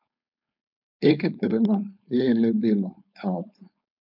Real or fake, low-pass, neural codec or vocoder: fake; 5.4 kHz; codec, 16 kHz, 4.8 kbps, FACodec